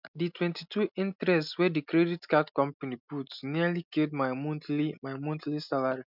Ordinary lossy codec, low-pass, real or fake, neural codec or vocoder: none; 5.4 kHz; real; none